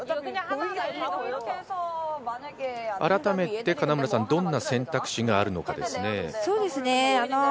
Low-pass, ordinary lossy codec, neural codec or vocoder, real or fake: none; none; none; real